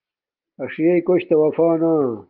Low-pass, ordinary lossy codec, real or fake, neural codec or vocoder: 5.4 kHz; Opus, 32 kbps; real; none